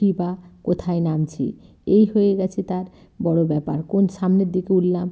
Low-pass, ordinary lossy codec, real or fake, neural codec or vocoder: none; none; real; none